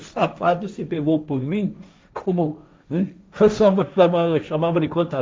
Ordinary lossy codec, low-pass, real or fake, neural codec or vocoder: none; none; fake; codec, 16 kHz, 1.1 kbps, Voila-Tokenizer